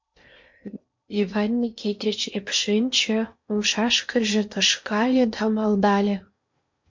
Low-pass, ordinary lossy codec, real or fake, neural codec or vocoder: 7.2 kHz; MP3, 48 kbps; fake; codec, 16 kHz in and 24 kHz out, 0.8 kbps, FocalCodec, streaming, 65536 codes